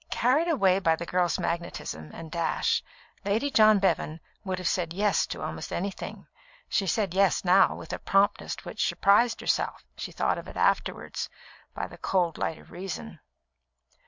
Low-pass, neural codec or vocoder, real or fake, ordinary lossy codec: 7.2 kHz; none; real; MP3, 64 kbps